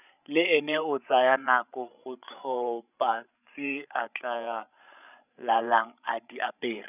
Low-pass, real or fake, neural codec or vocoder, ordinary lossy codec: 3.6 kHz; fake; codec, 16 kHz, 16 kbps, FreqCodec, larger model; none